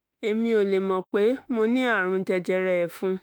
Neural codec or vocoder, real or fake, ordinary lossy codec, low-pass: autoencoder, 48 kHz, 32 numbers a frame, DAC-VAE, trained on Japanese speech; fake; none; none